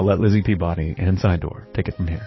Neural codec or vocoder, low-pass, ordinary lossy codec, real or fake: codec, 44.1 kHz, 7.8 kbps, DAC; 7.2 kHz; MP3, 24 kbps; fake